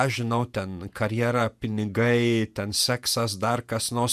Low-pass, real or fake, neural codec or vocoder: 14.4 kHz; fake; vocoder, 48 kHz, 128 mel bands, Vocos